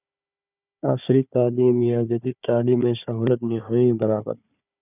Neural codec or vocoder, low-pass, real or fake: codec, 16 kHz, 4 kbps, FunCodec, trained on Chinese and English, 50 frames a second; 3.6 kHz; fake